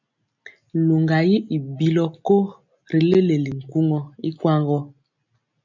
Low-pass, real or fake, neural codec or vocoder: 7.2 kHz; real; none